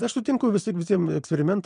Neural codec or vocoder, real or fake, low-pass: vocoder, 22.05 kHz, 80 mel bands, Vocos; fake; 9.9 kHz